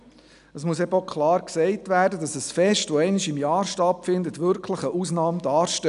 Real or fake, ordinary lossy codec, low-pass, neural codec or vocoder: real; none; 10.8 kHz; none